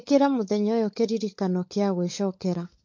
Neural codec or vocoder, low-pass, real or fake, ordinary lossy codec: codec, 16 kHz, 16 kbps, FunCodec, trained on LibriTTS, 50 frames a second; 7.2 kHz; fake; MP3, 48 kbps